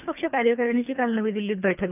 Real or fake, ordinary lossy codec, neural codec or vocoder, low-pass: fake; none; codec, 24 kHz, 3 kbps, HILCodec; 3.6 kHz